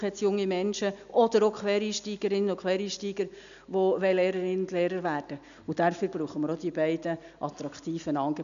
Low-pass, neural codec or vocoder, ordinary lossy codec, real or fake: 7.2 kHz; none; none; real